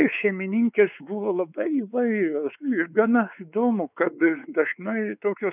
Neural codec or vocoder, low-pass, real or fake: codec, 16 kHz, 4 kbps, X-Codec, WavLM features, trained on Multilingual LibriSpeech; 3.6 kHz; fake